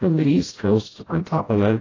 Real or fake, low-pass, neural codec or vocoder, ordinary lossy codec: fake; 7.2 kHz; codec, 16 kHz, 0.5 kbps, FreqCodec, smaller model; AAC, 32 kbps